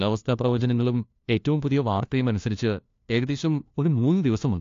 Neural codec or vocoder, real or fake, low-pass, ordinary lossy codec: codec, 16 kHz, 1 kbps, FunCodec, trained on LibriTTS, 50 frames a second; fake; 7.2 kHz; AAC, 48 kbps